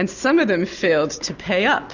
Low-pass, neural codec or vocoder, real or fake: 7.2 kHz; none; real